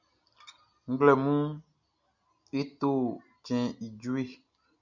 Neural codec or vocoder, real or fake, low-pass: none; real; 7.2 kHz